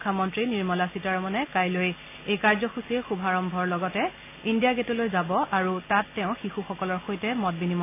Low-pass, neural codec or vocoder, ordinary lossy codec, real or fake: 3.6 kHz; none; AAC, 24 kbps; real